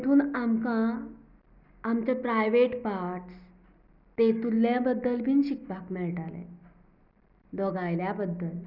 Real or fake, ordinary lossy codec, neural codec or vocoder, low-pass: real; none; none; 5.4 kHz